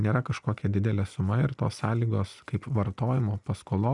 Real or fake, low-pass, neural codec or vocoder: real; 10.8 kHz; none